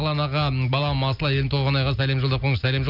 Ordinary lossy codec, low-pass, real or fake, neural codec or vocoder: none; 5.4 kHz; real; none